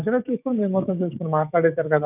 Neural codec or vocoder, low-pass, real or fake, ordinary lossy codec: codec, 16 kHz, 6 kbps, DAC; 3.6 kHz; fake; none